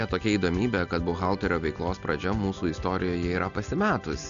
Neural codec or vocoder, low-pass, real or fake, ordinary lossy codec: none; 7.2 kHz; real; AAC, 96 kbps